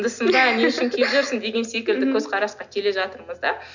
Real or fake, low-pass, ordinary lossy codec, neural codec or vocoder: real; 7.2 kHz; none; none